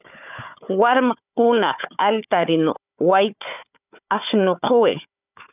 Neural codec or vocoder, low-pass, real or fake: codec, 16 kHz, 4 kbps, FunCodec, trained on Chinese and English, 50 frames a second; 3.6 kHz; fake